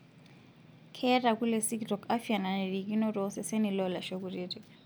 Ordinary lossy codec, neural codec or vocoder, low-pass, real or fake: none; none; none; real